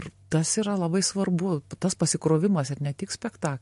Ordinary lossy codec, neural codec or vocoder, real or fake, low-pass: MP3, 48 kbps; none; real; 14.4 kHz